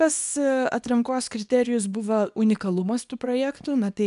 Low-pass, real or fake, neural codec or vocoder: 10.8 kHz; fake; codec, 24 kHz, 0.9 kbps, WavTokenizer, small release